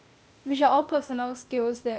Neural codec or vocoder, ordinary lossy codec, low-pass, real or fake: codec, 16 kHz, 0.8 kbps, ZipCodec; none; none; fake